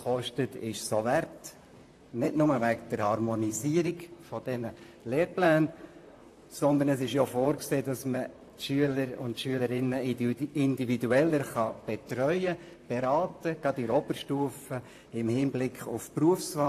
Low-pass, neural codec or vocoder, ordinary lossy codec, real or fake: 14.4 kHz; vocoder, 44.1 kHz, 128 mel bands, Pupu-Vocoder; AAC, 64 kbps; fake